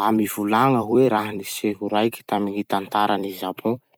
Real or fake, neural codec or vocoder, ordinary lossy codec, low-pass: fake; vocoder, 44.1 kHz, 128 mel bands every 256 samples, BigVGAN v2; none; none